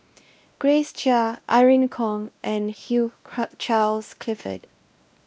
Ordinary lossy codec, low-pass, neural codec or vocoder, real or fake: none; none; codec, 16 kHz, 1 kbps, X-Codec, WavLM features, trained on Multilingual LibriSpeech; fake